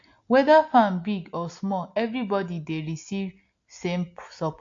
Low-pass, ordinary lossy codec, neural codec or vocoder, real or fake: 7.2 kHz; AAC, 48 kbps; none; real